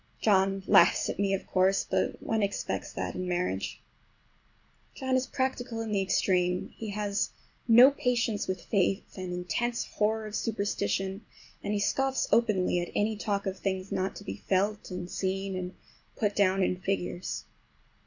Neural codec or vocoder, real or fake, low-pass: none; real; 7.2 kHz